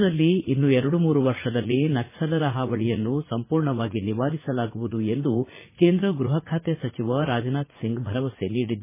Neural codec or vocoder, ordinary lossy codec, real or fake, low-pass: vocoder, 44.1 kHz, 80 mel bands, Vocos; MP3, 16 kbps; fake; 3.6 kHz